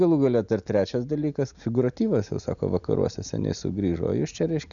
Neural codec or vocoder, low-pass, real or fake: none; 7.2 kHz; real